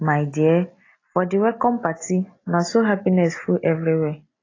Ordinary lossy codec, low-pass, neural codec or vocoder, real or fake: AAC, 32 kbps; 7.2 kHz; none; real